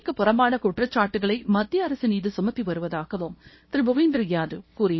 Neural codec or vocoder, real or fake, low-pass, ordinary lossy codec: codec, 24 kHz, 0.9 kbps, WavTokenizer, small release; fake; 7.2 kHz; MP3, 24 kbps